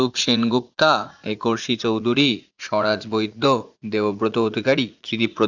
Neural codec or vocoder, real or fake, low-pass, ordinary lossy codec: vocoder, 44.1 kHz, 80 mel bands, Vocos; fake; 7.2 kHz; Opus, 64 kbps